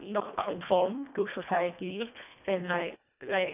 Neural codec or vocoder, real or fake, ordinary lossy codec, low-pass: codec, 24 kHz, 1.5 kbps, HILCodec; fake; none; 3.6 kHz